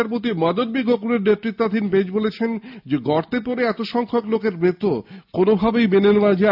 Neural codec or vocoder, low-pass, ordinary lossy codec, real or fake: none; 5.4 kHz; AAC, 48 kbps; real